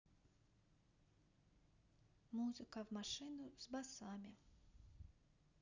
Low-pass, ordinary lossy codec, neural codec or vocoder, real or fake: 7.2 kHz; none; none; real